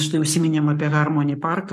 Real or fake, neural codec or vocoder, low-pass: fake; codec, 44.1 kHz, 7.8 kbps, Pupu-Codec; 14.4 kHz